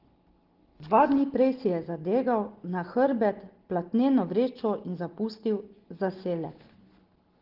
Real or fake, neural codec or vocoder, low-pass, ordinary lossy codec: real; none; 5.4 kHz; Opus, 16 kbps